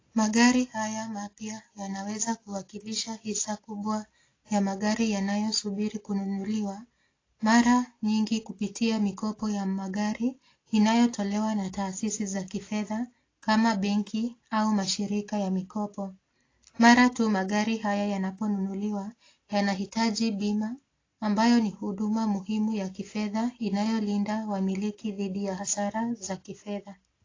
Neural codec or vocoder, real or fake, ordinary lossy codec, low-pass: none; real; AAC, 32 kbps; 7.2 kHz